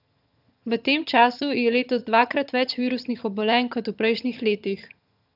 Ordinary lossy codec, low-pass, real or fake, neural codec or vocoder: none; 5.4 kHz; fake; vocoder, 22.05 kHz, 80 mel bands, HiFi-GAN